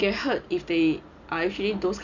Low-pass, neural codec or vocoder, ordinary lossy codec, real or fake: 7.2 kHz; none; none; real